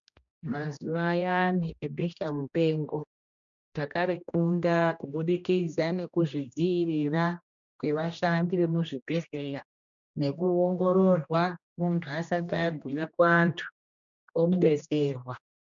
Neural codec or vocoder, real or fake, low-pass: codec, 16 kHz, 1 kbps, X-Codec, HuBERT features, trained on general audio; fake; 7.2 kHz